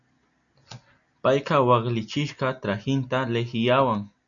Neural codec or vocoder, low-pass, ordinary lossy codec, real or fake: none; 7.2 kHz; MP3, 96 kbps; real